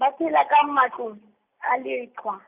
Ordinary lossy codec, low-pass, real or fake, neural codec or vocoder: Opus, 64 kbps; 3.6 kHz; real; none